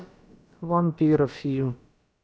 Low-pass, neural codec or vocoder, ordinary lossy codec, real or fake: none; codec, 16 kHz, about 1 kbps, DyCAST, with the encoder's durations; none; fake